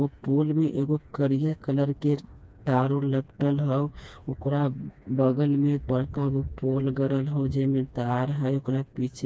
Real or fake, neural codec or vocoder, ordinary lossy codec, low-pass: fake; codec, 16 kHz, 2 kbps, FreqCodec, smaller model; none; none